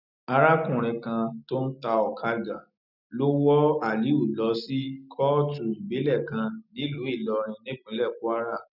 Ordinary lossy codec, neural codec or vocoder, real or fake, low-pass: none; none; real; 5.4 kHz